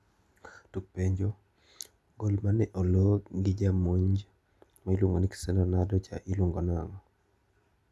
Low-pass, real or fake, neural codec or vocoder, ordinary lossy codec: none; real; none; none